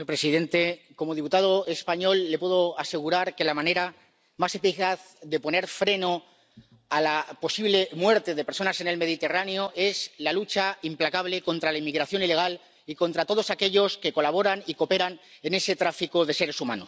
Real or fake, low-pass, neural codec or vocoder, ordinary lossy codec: real; none; none; none